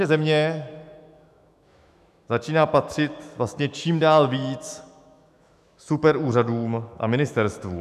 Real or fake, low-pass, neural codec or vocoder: fake; 14.4 kHz; autoencoder, 48 kHz, 128 numbers a frame, DAC-VAE, trained on Japanese speech